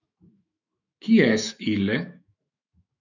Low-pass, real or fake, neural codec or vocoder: 7.2 kHz; fake; autoencoder, 48 kHz, 128 numbers a frame, DAC-VAE, trained on Japanese speech